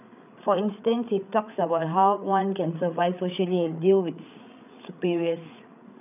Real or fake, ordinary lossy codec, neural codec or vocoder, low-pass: fake; none; codec, 16 kHz, 8 kbps, FreqCodec, larger model; 3.6 kHz